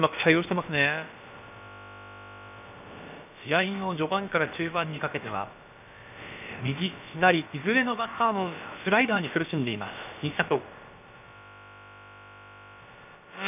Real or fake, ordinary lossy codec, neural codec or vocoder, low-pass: fake; none; codec, 16 kHz, about 1 kbps, DyCAST, with the encoder's durations; 3.6 kHz